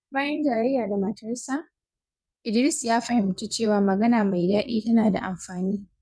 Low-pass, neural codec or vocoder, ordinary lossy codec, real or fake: none; vocoder, 22.05 kHz, 80 mel bands, WaveNeXt; none; fake